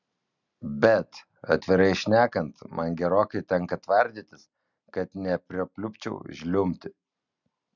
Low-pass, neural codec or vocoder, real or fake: 7.2 kHz; none; real